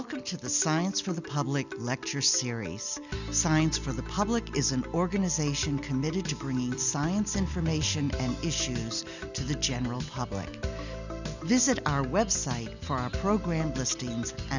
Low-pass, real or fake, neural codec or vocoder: 7.2 kHz; real; none